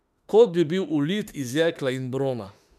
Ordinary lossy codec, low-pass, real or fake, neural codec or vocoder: none; 14.4 kHz; fake; autoencoder, 48 kHz, 32 numbers a frame, DAC-VAE, trained on Japanese speech